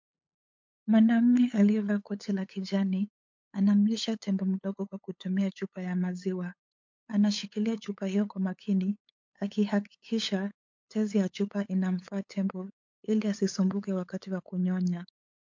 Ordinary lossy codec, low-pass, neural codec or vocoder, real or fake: MP3, 48 kbps; 7.2 kHz; codec, 16 kHz, 8 kbps, FunCodec, trained on LibriTTS, 25 frames a second; fake